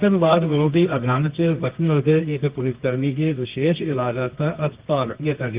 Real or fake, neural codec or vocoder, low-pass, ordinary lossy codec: fake; codec, 24 kHz, 0.9 kbps, WavTokenizer, medium music audio release; 3.6 kHz; Opus, 32 kbps